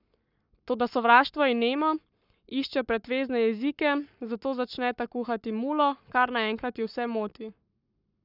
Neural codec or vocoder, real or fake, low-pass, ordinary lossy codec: codec, 44.1 kHz, 7.8 kbps, Pupu-Codec; fake; 5.4 kHz; none